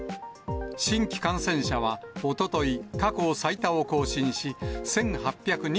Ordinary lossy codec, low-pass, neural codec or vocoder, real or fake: none; none; none; real